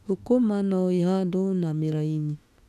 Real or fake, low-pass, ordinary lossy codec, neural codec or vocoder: fake; 14.4 kHz; none; autoencoder, 48 kHz, 32 numbers a frame, DAC-VAE, trained on Japanese speech